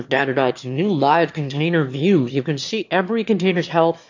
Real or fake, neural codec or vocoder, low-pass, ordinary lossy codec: fake; autoencoder, 22.05 kHz, a latent of 192 numbers a frame, VITS, trained on one speaker; 7.2 kHz; AAC, 48 kbps